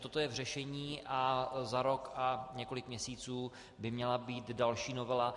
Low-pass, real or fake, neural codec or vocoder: 10.8 kHz; real; none